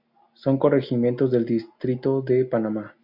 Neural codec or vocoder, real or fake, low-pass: none; real; 5.4 kHz